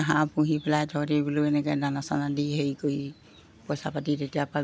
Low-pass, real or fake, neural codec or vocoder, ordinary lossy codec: none; real; none; none